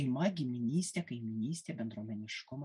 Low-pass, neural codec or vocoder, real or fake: 10.8 kHz; none; real